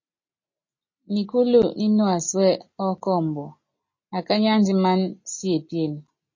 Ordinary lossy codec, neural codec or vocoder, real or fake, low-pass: MP3, 32 kbps; none; real; 7.2 kHz